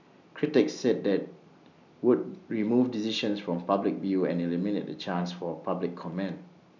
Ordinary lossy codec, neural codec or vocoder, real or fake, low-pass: none; none; real; 7.2 kHz